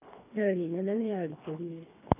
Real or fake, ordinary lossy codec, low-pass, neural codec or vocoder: fake; none; 3.6 kHz; codec, 24 kHz, 3 kbps, HILCodec